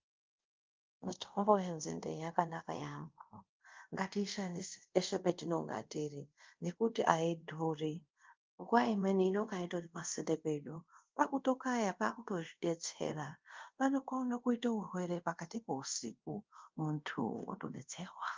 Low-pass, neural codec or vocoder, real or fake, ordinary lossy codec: 7.2 kHz; codec, 24 kHz, 0.5 kbps, DualCodec; fake; Opus, 24 kbps